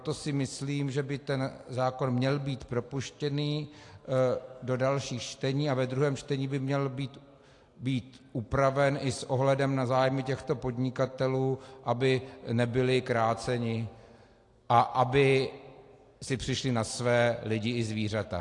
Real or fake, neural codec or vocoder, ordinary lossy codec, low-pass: real; none; AAC, 48 kbps; 10.8 kHz